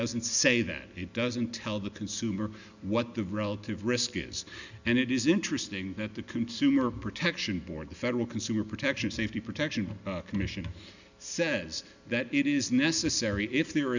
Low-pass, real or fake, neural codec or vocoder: 7.2 kHz; real; none